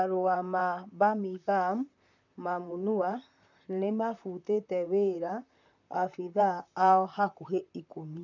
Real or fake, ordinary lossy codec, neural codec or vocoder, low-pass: fake; none; vocoder, 44.1 kHz, 128 mel bands, Pupu-Vocoder; 7.2 kHz